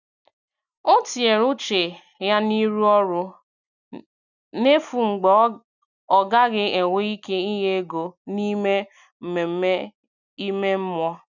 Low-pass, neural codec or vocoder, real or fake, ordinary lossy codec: 7.2 kHz; none; real; none